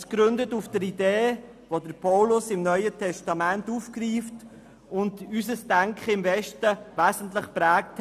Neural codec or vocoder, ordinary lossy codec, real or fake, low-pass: none; none; real; 14.4 kHz